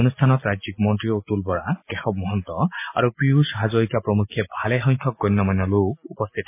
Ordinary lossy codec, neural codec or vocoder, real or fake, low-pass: MP3, 24 kbps; none; real; 3.6 kHz